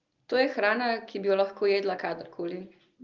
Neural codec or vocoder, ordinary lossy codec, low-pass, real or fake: none; Opus, 32 kbps; 7.2 kHz; real